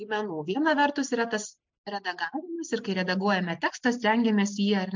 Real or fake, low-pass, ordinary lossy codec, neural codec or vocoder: real; 7.2 kHz; MP3, 64 kbps; none